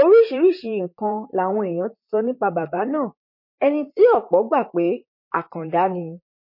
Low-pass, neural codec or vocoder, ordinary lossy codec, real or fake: 5.4 kHz; vocoder, 44.1 kHz, 128 mel bands, Pupu-Vocoder; MP3, 32 kbps; fake